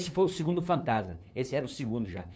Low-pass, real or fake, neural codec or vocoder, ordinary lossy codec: none; fake; codec, 16 kHz, 2 kbps, FunCodec, trained on LibriTTS, 25 frames a second; none